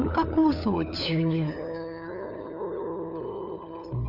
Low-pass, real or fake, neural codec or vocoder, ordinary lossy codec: 5.4 kHz; fake; codec, 16 kHz, 4 kbps, FunCodec, trained on LibriTTS, 50 frames a second; none